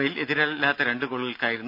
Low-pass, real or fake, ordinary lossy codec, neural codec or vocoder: 5.4 kHz; real; none; none